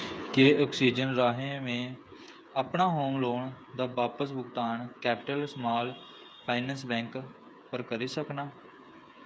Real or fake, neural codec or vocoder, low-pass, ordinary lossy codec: fake; codec, 16 kHz, 8 kbps, FreqCodec, smaller model; none; none